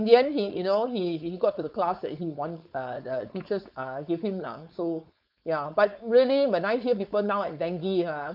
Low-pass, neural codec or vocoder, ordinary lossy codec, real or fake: 5.4 kHz; codec, 16 kHz, 4.8 kbps, FACodec; none; fake